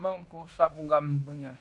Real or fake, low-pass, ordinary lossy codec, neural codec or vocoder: fake; 10.8 kHz; AAC, 64 kbps; codec, 16 kHz in and 24 kHz out, 0.9 kbps, LongCat-Audio-Codec, fine tuned four codebook decoder